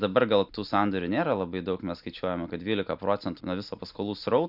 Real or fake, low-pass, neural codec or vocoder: real; 5.4 kHz; none